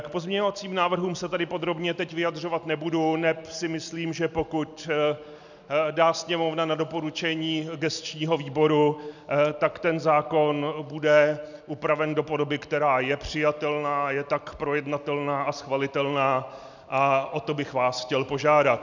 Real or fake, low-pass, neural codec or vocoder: real; 7.2 kHz; none